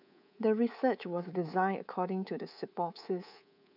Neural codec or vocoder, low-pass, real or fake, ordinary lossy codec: codec, 24 kHz, 3.1 kbps, DualCodec; 5.4 kHz; fake; none